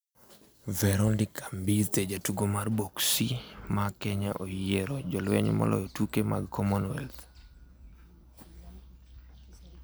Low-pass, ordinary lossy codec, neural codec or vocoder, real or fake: none; none; none; real